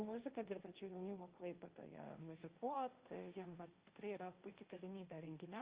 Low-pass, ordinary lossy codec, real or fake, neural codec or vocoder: 3.6 kHz; Opus, 32 kbps; fake; codec, 16 kHz, 1.1 kbps, Voila-Tokenizer